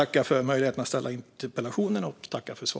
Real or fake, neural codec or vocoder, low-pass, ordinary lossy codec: real; none; none; none